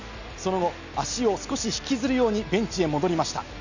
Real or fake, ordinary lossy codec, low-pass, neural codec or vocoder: real; none; 7.2 kHz; none